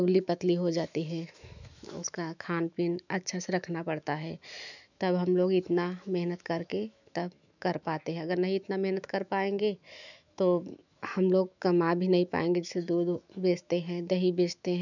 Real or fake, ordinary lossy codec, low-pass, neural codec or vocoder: fake; none; 7.2 kHz; autoencoder, 48 kHz, 128 numbers a frame, DAC-VAE, trained on Japanese speech